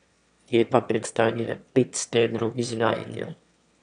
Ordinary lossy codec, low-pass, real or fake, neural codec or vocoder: none; 9.9 kHz; fake; autoencoder, 22.05 kHz, a latent of 192 numbers a frame, VITS, trained on one speaker